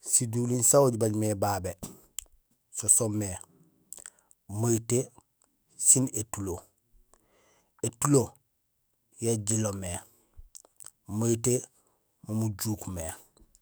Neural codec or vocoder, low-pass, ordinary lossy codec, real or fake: autoencoder, 48 kHz, 128 numbers a frame, DAC-VAE, trained on Japanese speech; none; none; fake